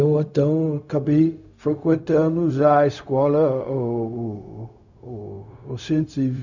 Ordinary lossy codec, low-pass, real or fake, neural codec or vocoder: none; 7.2 kHz; fake; codec, 16 kHz, 0.4 kbps, LongCat-Audio-Codec